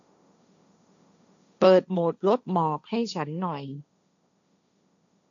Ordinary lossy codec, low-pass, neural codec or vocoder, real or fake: none; 7.2 kHz; codec, 16 kHz, 1.1 kbps, Voila-Tokenizer; fake